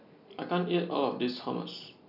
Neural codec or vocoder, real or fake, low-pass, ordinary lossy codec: none; real; 5.4 kHz; none